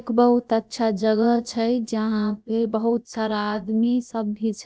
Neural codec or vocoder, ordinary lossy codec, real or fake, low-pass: codec, 16 kHz, about 1 kbps, DyCAST, with the encoder's durations; none; fake; none